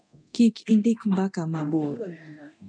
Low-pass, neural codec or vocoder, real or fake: 9.9 kHz; codec, 24 kHz, 0.9 kbps, DualCodec; fake